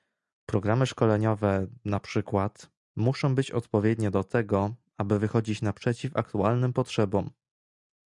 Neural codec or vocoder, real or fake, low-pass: none; real; 10.8 kHz